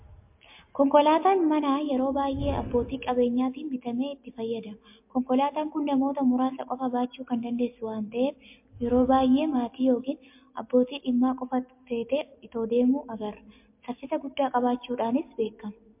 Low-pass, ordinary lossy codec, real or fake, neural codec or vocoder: 3.6 kHz; MP3, 32 kbps; real; none